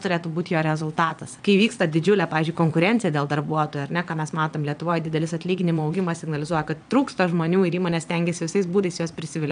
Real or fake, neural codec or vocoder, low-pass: fake; vocoder, 22.05 kHz, 80 mel bands, Vocos; 9.9 kHz